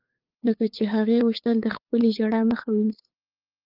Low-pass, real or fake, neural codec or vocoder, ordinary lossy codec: 5.4 kHz; fake; codec, 16 kHz, 8 kbps, FunCodec, trained on LibriTTS, 25 frames a second; Opus, 24 kbps